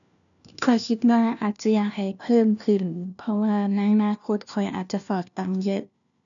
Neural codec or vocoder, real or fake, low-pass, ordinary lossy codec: codec, 16 kHz, 1 kbps, FunCodec, trained on LibriTTS, 50 frames a second; fake; 7.2 kHz; none